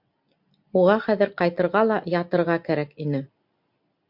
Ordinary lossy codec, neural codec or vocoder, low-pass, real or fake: MP3, 48 kbps; none; 5.4 kHz; real